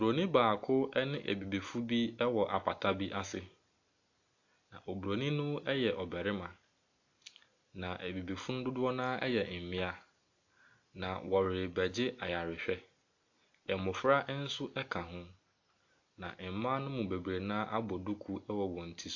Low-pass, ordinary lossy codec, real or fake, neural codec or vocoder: 7.2 kHz; AAC, 48 kbps; real; none